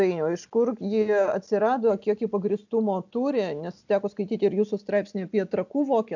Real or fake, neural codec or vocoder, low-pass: real; none; 7.2 kHz